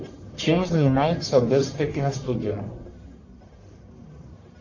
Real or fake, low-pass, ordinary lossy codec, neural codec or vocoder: fake; 7.2 kHz; AAC, 48 kbps; codec, 44.1 kHz, 1.7 kbps, Pupu-Codec